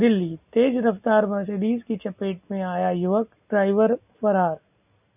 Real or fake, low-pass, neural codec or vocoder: real; 3.6 kHz; none